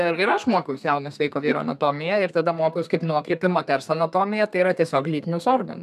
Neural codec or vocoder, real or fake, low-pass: codec, 32 kHz, 1.9 kbps, SNAC; fake; 14.4 kHz